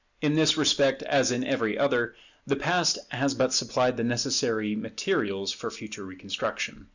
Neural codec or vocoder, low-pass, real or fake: none; 7.2 kHz; real